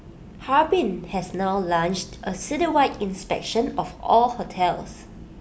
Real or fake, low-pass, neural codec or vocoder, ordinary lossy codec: real; none; none; none